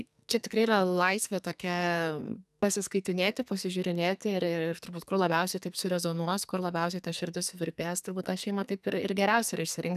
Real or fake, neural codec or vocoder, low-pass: fake; codec, 44.1 kHz, 2.6 kbps, SNAC; 14.4 kHz